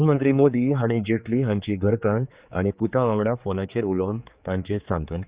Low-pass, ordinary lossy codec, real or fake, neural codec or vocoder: 3.6 kHz; Opus, 64 kbps; fake; codec, 16 kHz, 4 kbps, X-Codec, HuBERT features, trained on general audio